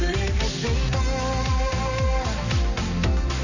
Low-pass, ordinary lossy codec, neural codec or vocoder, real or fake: 7.2 kHz; none; none; real